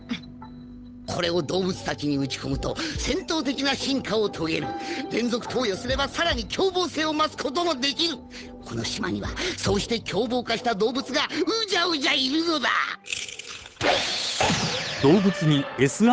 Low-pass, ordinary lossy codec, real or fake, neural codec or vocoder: none; none; fake; codec, 16 kHz, 8 kbps, FunCodec, trained on Chinese and English, 25 frames a second